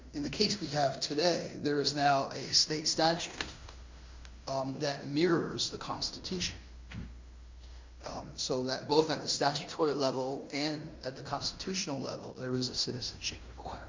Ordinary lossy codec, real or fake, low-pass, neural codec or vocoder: MP3, 64 kbps; fake; 7.2 kHz; codec, 16 kHz in and 24 kHz out, 0.9 kbps, LongCat-Audio-Codec, fine tuned four codebook decoder